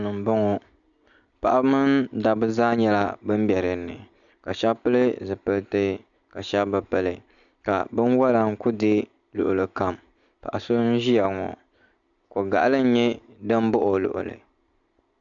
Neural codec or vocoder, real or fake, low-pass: none; real; 7.2 kHz